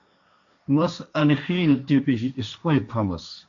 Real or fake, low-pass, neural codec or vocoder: fake; 7.2 kHz; codec, 16 kHz, 1.1 kbps, Voila-Tokenizer